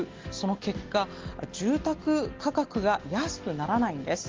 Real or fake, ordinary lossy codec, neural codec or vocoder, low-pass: real; Opus, 16 kbps; none; 7.2 kHz